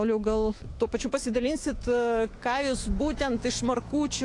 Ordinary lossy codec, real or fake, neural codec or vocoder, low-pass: AAC, 48 kbps; fake; autoencoder, 48 kHz, 128 numbers a frame, DAC-VAE, trained on Japanese speech; 10.8 kHz